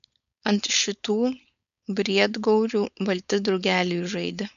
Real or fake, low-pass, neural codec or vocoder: fake; 7.2 kHz; codec, 16 kHz, 4.8 kbps, FACodec